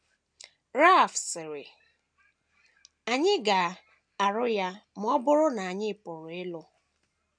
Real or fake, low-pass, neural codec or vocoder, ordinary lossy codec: real; 9.9 kHz; none; none